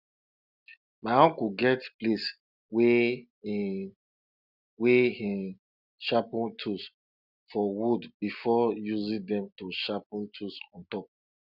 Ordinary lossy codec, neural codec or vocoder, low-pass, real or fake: none; none; 5.4 kHz; real